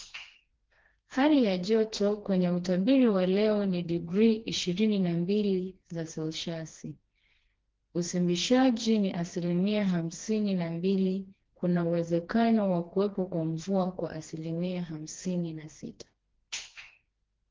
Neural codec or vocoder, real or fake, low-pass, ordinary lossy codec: codec, 16 kHz, 2 kbps, FreqCodec, smaller model; fake; 7.2 kHz; Opus, 16 kbps